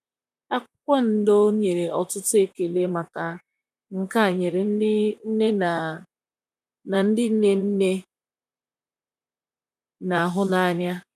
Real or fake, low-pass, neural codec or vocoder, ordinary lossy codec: fake; 14.4 kHz; vocoder, 44.1 kHz, 128 mel bands, Pupu-Vocoder; none